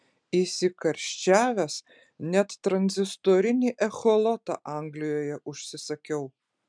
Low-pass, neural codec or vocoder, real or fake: 9.9 kHz; none; real